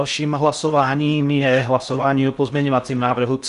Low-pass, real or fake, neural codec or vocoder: 10.8 kHz; fake; codec, 16 kHz in and 24 kHz out, 0.6 kbps, FocalCodec, streaming, 4096 codes